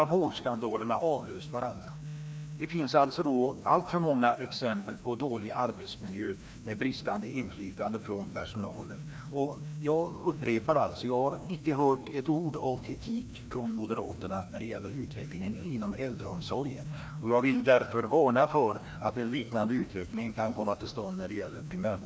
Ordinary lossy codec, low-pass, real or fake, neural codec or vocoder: none; none; fake; codec, 16 kHz, 1 kbps, FreqCodec, larger model